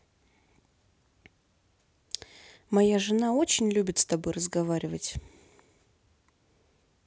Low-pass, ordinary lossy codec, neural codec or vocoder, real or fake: none; none; none; real